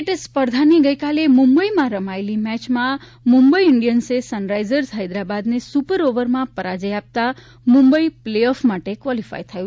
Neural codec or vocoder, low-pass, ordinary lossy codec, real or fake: none; none; none; real